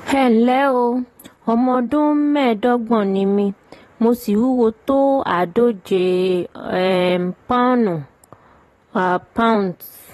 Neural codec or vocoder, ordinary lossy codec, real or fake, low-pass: vocoder, 44.1 kHz, 128 mel bands, Pupu-Vocoder; AAC, 32 kbps; fake; 19.8 kHz